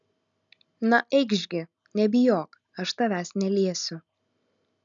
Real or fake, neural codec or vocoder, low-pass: real; none; 7.2 kHz